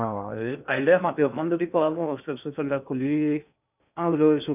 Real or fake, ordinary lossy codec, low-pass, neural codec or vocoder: fake; none; 3.6 kHz; codec, 16 kHz in and 24 kHz out, 0.6 kbps, FocalCodec, streaming, 2048 codes